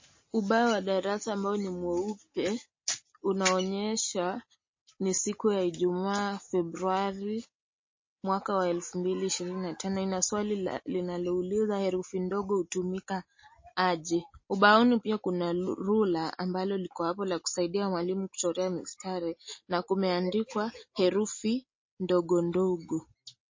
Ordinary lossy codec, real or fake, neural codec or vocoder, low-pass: MP3, 32 kbps; real; none; 7.2 kHz